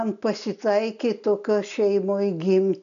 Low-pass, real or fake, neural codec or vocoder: 7.2 kHz; real; none